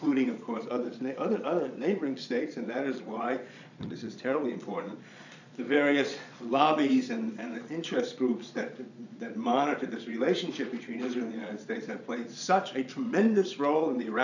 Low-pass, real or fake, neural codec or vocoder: 7.2 kHz; fake; vocoder, 22.05 kHz, 80 mel bands, Vocos